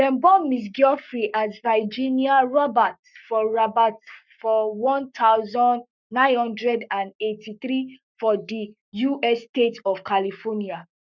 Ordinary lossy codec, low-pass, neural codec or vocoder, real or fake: none; 7.2 kHz; codec, 44.1 kHz, 7.8 kbps, Pupu-Codec; fake